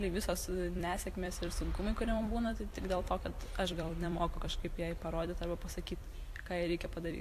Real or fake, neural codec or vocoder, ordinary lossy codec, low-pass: fake; vocoder, 44.1 kHz, 128 mel bands every 256 samples, BigVGAN v2; AAC, 64 kbps; 14.4 kHz